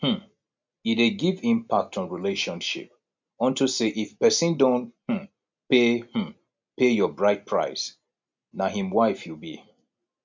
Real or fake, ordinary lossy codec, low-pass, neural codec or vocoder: real; MP3, 64 kbps; 7.2 kHz; none